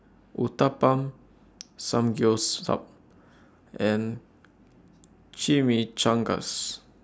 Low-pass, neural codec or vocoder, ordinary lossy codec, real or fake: none; none; none; real